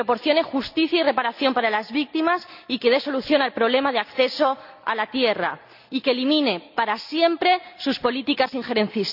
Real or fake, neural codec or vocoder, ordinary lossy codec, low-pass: real; none; none; 5.4 kHz